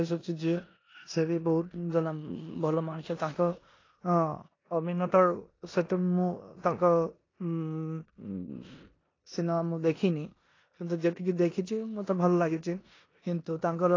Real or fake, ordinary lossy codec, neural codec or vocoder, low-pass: fake; AAC, 32 kbps; codec, 16 kHz in and 24 kHz out, 0.9 kbps, LongCat-Audio-Codec, four codebook decoder; 7.2 kHz